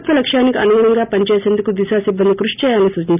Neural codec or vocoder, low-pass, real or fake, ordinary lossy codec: none; 3.6 kHz; real; none